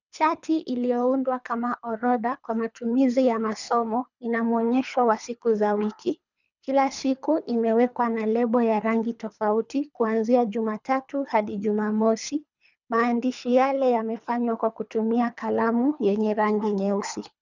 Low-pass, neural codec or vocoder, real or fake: 7.2 kHz; codec, 24 kHz, 3 kbps, HILCodec; fake